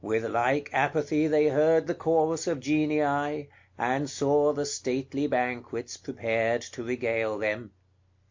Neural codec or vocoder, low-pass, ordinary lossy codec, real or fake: none; 7.2 kHz; MP3, 48 kbps; real